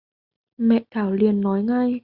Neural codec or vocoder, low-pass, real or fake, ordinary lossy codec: none; 5.4 kHz; real; Opus, 64 kbps